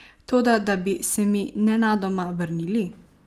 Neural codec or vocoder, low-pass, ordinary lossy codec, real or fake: none; 14.4 kHz; Opus, 24 kbps; real